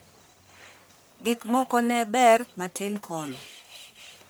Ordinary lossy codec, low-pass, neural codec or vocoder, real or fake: none; none; codec, 44.1 kHz, 1.7 kbps, Pupu-Codec; fake